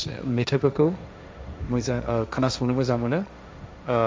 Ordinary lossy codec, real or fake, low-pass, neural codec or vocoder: none; fake; none; codec, 16 kHz, 1.1 kbps, Voila-Tokenizer